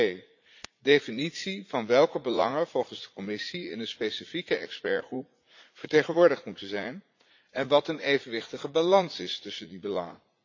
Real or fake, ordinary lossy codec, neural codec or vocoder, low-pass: fake; AAC, 48 kbps; vocoder, 44.1 kHz, 80 mel bands, Vocos; 7.2 kHz